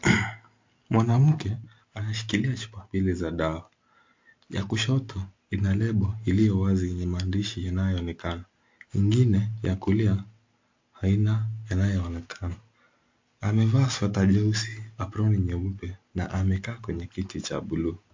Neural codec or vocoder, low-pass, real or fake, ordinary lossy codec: none; 7.2 kHz; real; MP3, 48 kbps